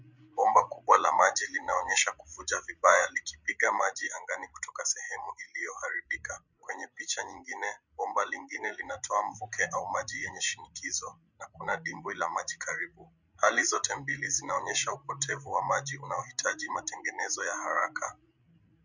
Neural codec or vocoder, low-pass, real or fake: codec, 16 kHz, 16 kbps, FreqCodec, larger model; 7.2 kHz; fake